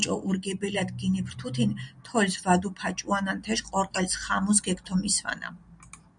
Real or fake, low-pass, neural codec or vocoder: real; 9.9 kHz; none